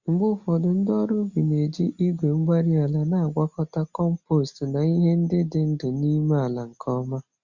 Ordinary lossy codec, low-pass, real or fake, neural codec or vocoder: Opus, 64 kbps; 7.2 kHz; real; none